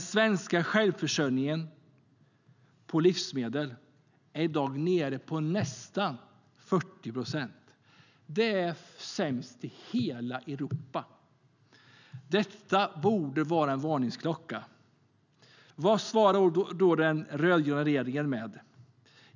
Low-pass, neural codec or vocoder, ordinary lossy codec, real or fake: 7.2 kHz; none; none; real